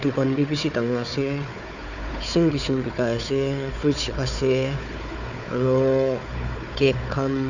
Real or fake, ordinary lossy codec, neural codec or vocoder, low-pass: fake; none; codec, 16 kHz, 4 kbps, FunCodec, trained on Chinese and English, 50 frames a second; 7.2 kHz